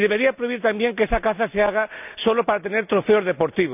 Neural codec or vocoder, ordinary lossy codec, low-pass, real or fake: none; none; 3.6 kHz; real